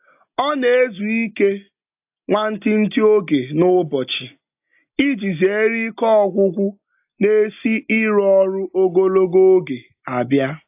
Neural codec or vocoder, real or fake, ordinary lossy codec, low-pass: none; real; AAC, 32 kbps; 3.6 kHz